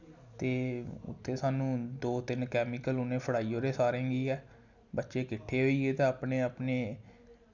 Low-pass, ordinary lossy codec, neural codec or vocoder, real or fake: 7.2 kHz; none; none; real